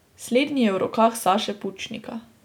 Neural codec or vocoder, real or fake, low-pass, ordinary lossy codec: none; real; 19.8 kHz; none